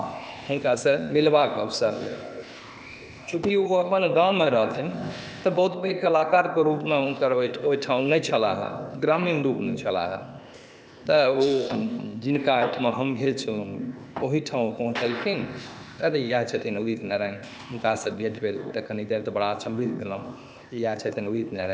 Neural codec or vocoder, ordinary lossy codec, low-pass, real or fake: codec, 16 kHz, 0.8 kbps, ZipCodec; none; none; fake